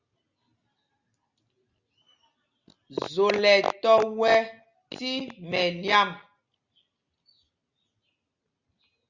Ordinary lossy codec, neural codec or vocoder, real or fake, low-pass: Opus, 64 kbps; none; real; 7.2 kHz